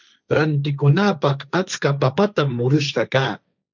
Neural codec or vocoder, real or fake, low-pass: codec, 16 kHz, 1.1 kbps, Voila-Tokenizer; fake; 7.2 kHz